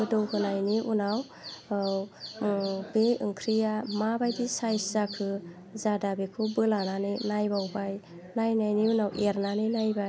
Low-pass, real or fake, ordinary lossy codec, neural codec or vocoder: none; real; none; none